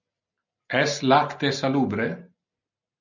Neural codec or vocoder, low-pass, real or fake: none; 7.2 kHz; real